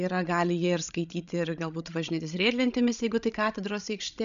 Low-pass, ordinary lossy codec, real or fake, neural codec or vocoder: 7.2 kHz; MP3, 96 kbps; fake; codec, 16 kHz, 16 kbps, FreqCodec, larger model